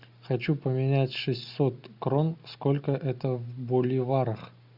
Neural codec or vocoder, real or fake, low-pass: none; real; 5.4 kHz